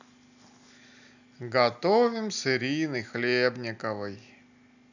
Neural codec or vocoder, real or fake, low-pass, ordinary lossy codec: none; real; 7.2 kHz; none